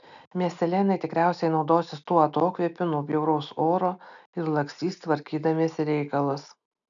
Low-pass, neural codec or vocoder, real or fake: 7.2 kHz; none; real